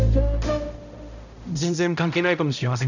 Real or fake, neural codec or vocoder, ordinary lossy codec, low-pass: fake; codec, 16 kHz, 0.5 kbps, X-Codec, HuBERT features, trained on balanced general audio; none; 7.2 kHz